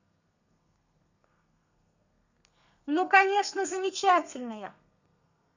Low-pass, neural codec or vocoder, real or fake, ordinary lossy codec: 7.2 kHz; codec, 32 kHz, 1.9 kbps, SNAC; fake; none